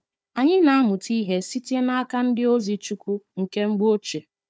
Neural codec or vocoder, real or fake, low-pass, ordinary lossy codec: codec, 16 kHz, 4 kbps, FunCodec, trained on Chinese and English, 50 frames a second; fake; none; none